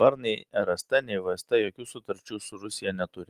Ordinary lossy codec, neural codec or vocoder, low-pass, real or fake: Opus, 24 kbps; none; 14.4 kHz; real